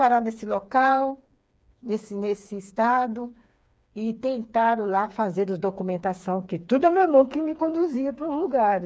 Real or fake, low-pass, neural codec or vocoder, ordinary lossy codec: fake; none; codec, 16 kHz, 4 kbps, FreqCodec, smaller model; none